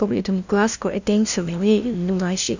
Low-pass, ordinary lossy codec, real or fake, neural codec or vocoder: 7.2 kHz; none; fake; codec, 16 kHz, 0.5 kbps, FunCodec, trained on LibriTTS, 25 frames a second